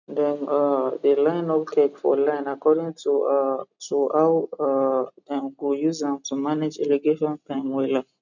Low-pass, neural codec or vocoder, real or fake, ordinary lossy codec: 7.2 kHz; none; real; none